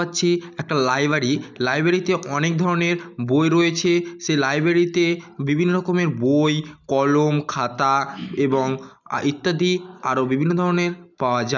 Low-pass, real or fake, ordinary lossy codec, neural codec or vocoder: 7.2 kHz; real; none; none